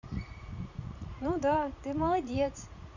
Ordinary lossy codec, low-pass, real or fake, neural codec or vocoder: none; 7.2 kHz; fake; vocoder, 44.1 kHz, 80 mel bands, Vocos